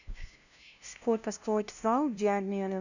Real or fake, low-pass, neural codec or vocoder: fake; 7.2 kHz; codec, 16 kHz, 0.5 kbps, FunCodec, trained on LibriTTS, 25 frames a second